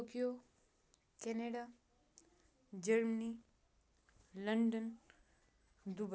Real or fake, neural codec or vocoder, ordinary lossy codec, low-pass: real; none; none; none